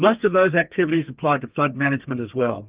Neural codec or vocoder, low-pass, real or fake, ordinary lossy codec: codec, 32 kHz, 1.9 kbps, SNAC; 3.6 kHz; fake; Opus, 24 kbps